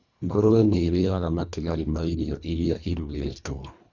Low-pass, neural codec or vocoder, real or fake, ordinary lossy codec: 7.2 kHz; codec, 24 kHz, 1.5 kbps, HILCodec; fake; none